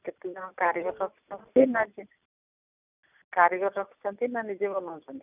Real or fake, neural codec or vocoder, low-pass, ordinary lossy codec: real; none; 3.6 kHz; none